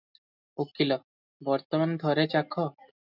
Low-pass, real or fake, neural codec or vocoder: 5.4 kHz; real; none